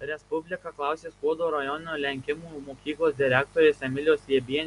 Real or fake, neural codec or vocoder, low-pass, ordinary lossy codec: real; none; 10.8 kHz; MP3, 96 kbps